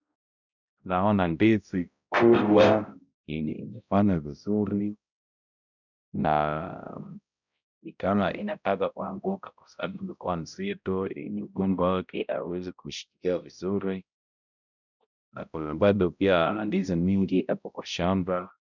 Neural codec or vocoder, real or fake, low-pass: codec, 16 kHz, 0.5 kbps, X-Codec, HuBERT features, trained on balanced general audio; fake; 7.2 kHz